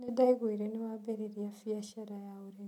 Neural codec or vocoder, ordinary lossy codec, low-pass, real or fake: none; none; 19.8 kHz; real